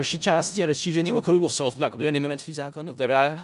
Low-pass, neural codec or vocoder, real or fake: 10.8 kHz; codec, 16 kHz in and 24 kHz out, 0.4 kbps, LongCat-Audio-Codec, four codebook decoder; fake